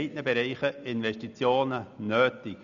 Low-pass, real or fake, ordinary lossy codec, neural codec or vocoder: 7.2 kHz; real; none; none